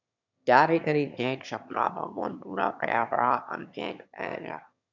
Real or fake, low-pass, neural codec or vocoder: fake; 7.2 kHz; autoencoder, 22.05 kHz, a latent of 192 numbers a frame, VITS, trained on one speaker